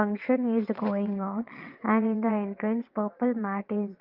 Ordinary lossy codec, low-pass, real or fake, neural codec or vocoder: Opus, 32 kbps; 5.4 kHz; fake; vocoder, 22.05 kHz, 80 mel bands, WaveNeXt